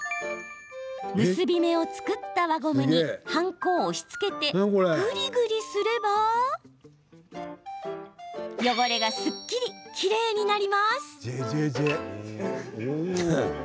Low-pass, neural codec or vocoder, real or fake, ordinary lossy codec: none; none; real; none